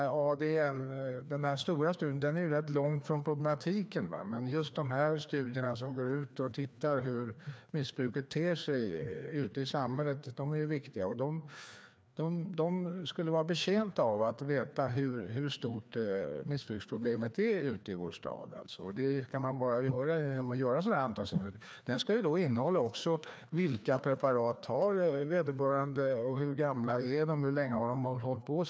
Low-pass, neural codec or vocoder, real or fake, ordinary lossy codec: none; codec, 16 kHz, 2 kbps, FreqCodec, larger model; fake; none